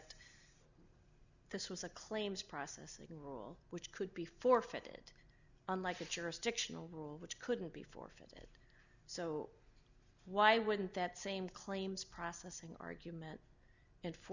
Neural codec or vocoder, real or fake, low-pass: none; real; 7.2 kHz